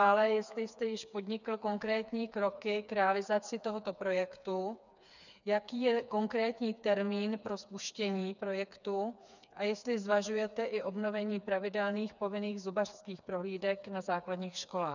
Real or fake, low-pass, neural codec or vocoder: fake; 7.2 kHz; codec, 16 kHz, 4 kbps, FreqCodec, smaller model